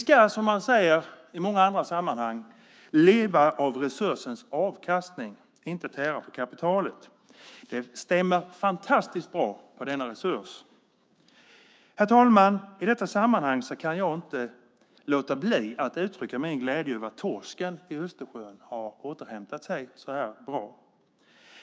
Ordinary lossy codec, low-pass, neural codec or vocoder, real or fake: none; none; codec, 16 kHz, 6 kbps, DAC; fake